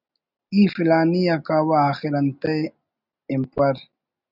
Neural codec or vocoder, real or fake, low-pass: none; real; 5.4 kHz